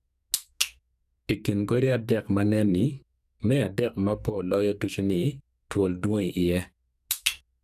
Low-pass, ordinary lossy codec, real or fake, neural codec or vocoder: 14.4 kHz; none; fake; codec, 44.1 kHz, 2.6 kbps, SNAC